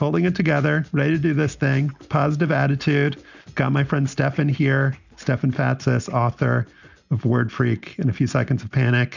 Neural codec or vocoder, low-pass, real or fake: none; 7.2 kHz; real